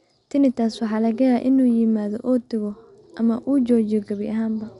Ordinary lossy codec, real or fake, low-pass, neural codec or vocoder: none; real; 10.8 kHz; none